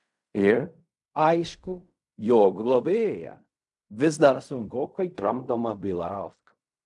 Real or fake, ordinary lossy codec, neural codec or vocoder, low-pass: fake; MP3, 96 kbps; codec, 16 kHz in and 24 kHz out, 0.4 kbps, LongCat-Audio-Codec, fine tuned four codebook decoder; 10.8 kHz